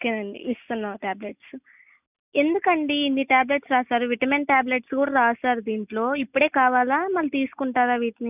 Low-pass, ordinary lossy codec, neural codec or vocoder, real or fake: 3.6 kHz; none; none; real